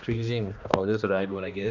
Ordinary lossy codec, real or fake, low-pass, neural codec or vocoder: none; fake; 7.2 kHz; codec, 16 kHz, 1 kbps, X-Codec, HuBERT features, trained on balanced general audio